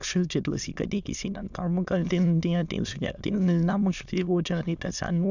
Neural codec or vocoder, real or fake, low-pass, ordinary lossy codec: autoencoder, 22.05 kHz, a latent of 192 numbers a frame, VITS, trained on many speakers; fake; 7.2 kHz; none